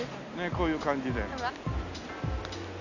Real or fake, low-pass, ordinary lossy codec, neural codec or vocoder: real; 7.2 kHz; none; none